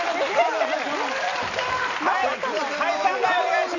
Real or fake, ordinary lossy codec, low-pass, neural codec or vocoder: real; none; 7.2 kHz; none